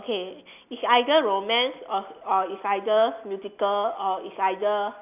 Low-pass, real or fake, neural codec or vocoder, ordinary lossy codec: 3.6 kHz; real; none; none